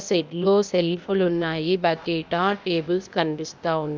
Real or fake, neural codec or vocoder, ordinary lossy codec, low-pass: fake; codec, 16 kHz, 0.8 kbps, ZipCodec; none; none